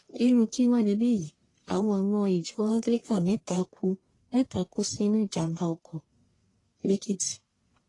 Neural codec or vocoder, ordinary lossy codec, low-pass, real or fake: codec, 44.1 kHz, 1.7 kbps, Pupu-Codec; AAC, 32 kbps; 10.8 kHz; fake